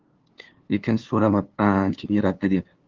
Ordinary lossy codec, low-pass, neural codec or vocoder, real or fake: Opus, 16 kbps; 7.2 kHz; codec, 16 kHz, 2 kbps, FunCodec, trained on LibriTTS, 25 frames a second; fake